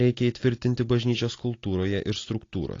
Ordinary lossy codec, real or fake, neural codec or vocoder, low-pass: AAC, 32 kbps; real; none; 7.2 kHz